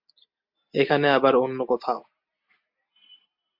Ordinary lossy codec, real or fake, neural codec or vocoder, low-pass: MP3, 48 kbps; real; none; 5.4 kHz